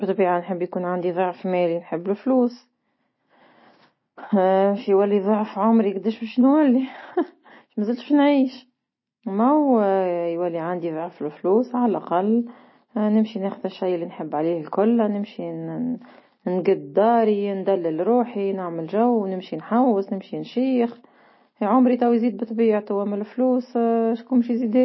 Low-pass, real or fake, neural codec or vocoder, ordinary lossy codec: 7.2 kHz; real; none; MP3, 24 kbps